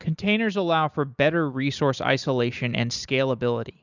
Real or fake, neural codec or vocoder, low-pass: real; none; 7.2 kHz